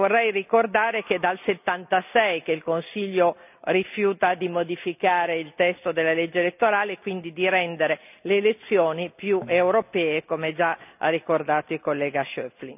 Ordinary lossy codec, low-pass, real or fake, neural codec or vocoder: none; 3.6 kHz; real; none